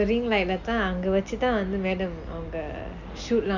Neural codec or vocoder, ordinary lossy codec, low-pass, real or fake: none; none; 7.2 kHz; real